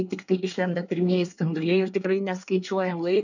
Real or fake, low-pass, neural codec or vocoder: fake; 7.2 kHz; codec, 24 kHz, 1 kbps, SNAC